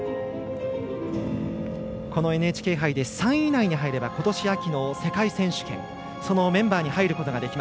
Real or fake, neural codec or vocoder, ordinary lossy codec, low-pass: real; none; none; none